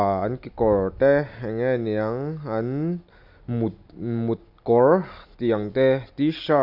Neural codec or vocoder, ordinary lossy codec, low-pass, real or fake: none; Opus, 64 kbps; 5.4 kHz; real